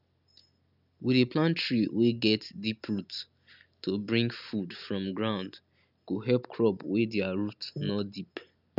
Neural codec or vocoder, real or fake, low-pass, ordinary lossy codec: none; real; 5.4 kHz; none